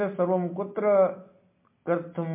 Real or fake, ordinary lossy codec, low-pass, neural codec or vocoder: real; MP3, 24 kbps; 3.6 kHz; none